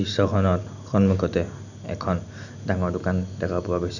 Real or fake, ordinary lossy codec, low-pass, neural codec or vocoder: real; none; 7.2 kHz; none